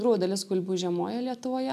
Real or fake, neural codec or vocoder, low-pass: real; none; 14.4 kHz